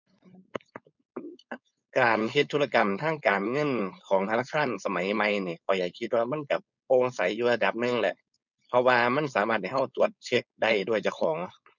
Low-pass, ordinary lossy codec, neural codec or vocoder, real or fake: 7.2 kHz; none; codec, 16 kHz, 4.8 kbps, FACodec; fake